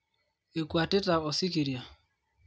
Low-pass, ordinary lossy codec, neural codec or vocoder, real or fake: none; none; none; real